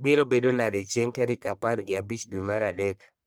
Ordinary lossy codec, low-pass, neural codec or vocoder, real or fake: none; none; codec, 44.1 kHz, 1.7 kbps, Pupu-Codec; fake